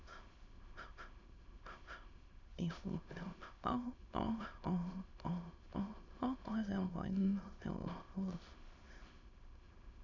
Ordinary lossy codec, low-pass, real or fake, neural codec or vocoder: none; 7.2 kHz; fake; autoencoder, 22.05 kHz, a latent of 192 numbers a frame, VITS, trained on many speakers